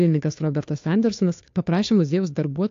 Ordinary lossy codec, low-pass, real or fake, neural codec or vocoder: AAC, 48 kbps; 7.2 kHz; fake; codec, 16 kHz, 2 kbps, FunCodec, trained on Chinese and English, 25 frames a second